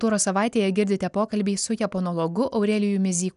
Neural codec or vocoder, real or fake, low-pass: none; real; 10.8 kHz